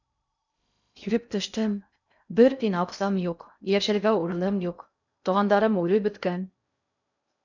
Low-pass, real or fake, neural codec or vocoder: 7.2 kHz; fake; codec, 16 kHz in and 24 kHz out, 0.6 kbps, FocalCodec, streaming, 2048 codes